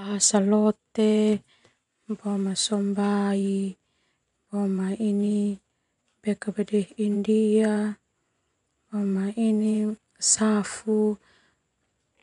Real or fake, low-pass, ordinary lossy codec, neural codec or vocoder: fake; 10.8 kHz; none; vocoder, 24 kHz, 100 mel bands, Vocos